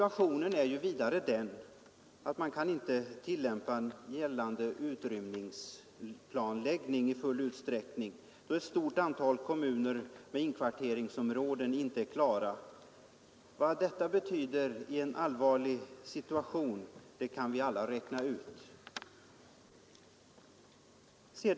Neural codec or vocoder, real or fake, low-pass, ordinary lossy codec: none; real; none; none